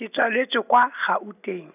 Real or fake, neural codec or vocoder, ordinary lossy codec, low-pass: real; none; none; 3.6 kHz